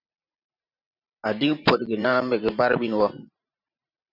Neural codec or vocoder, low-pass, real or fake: vocoder, 44.1 kHz, 128 mel bands every 512 samples, BigVGAN v2; 5.4 kHz; fake